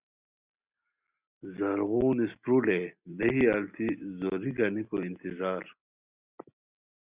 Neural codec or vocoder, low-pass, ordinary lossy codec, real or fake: none; 3.6 kHz; Opus, 64 kbps; real